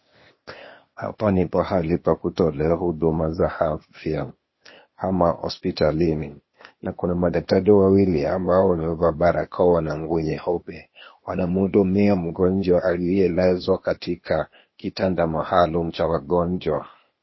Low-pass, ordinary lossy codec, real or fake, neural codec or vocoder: 7.2 kHz; MP3, 24 kbps; fake; codec, 16 kHz, 0.8 kbps, ZipCodec